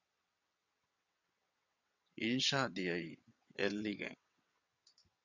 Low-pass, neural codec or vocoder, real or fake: 7.2 kHz; vocoder, 22.05 kHz, 80 mel bands, WaveNeXt; fake